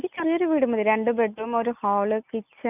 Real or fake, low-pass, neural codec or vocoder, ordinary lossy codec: real; 3.6 kHz; none; none